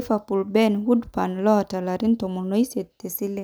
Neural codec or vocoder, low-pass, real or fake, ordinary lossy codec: none; none; real; none